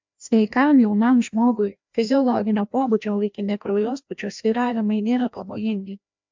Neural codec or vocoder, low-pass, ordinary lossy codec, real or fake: codec, 16 kHz, 1 kbps, FreqCodec, larger model; 7.2 kHz; MP3, 64 kbps; fake